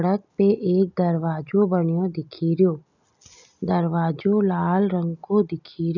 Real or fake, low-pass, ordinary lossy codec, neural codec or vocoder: real; 7.2 kHz; none; none